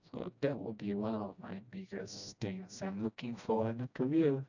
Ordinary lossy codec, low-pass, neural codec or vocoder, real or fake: none; 7.2 kHz; codec, 16 kHz, 1 kbps, FreqCodec, smaller model; fake